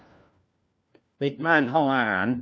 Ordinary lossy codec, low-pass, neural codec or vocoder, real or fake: none; none; codec, 16 kHz, 1 kbps, FunCodec, trained on LibriTTS, 50 frames a second; fake